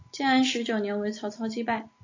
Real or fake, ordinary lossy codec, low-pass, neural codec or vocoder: real; AAC, 48 kbps; 7.2 kHz; none